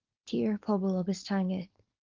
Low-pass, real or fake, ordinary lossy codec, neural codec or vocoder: 7.2 kHz; fake; Opus, 32 kbps; codec, 16 kHz, 4.8 kbps, FACodec